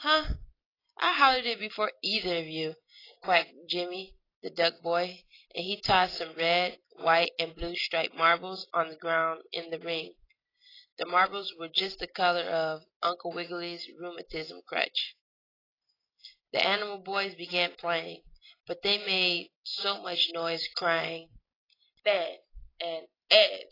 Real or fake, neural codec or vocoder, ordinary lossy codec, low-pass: real; none; AAC, 24 kbps; 5.4 kHz